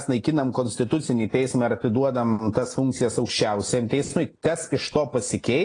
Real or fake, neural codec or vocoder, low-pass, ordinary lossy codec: real; none; 9.9 kHz; AAC, 32 kbps